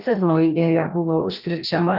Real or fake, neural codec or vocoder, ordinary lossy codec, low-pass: fake; codec, 16 kHz, 1 kbps, FreqCodec, larger model; Opus, 24 kbps; 5.4 kHz